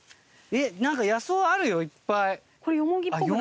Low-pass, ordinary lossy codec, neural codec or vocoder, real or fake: none; none; none; real